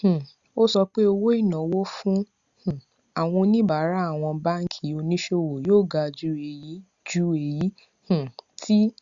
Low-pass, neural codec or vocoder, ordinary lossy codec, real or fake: 7.2 kHz; none; Opus, 64 kbps; real